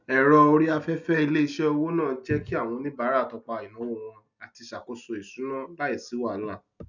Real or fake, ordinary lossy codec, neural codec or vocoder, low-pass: real; none; none; 7.2 kHz